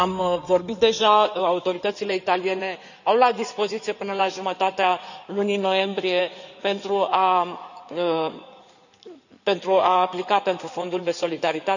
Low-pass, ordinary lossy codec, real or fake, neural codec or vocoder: 7.2 kHz; none; fake; codec, 16 kHz in and 24 kHz out, 2.2 kbps, FireRedTTS-2 codec